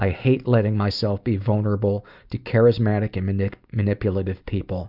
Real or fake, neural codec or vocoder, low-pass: real; none; 5.4 kHz